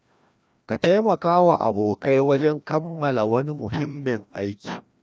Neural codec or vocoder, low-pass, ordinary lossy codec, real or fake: codec, 16 kHz, 1 kbps, FreqCodec, larger model; none; none; fake